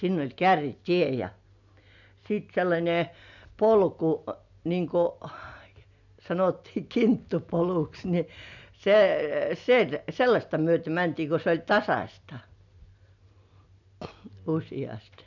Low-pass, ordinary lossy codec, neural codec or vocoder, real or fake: 7.2 kHz; none; none; real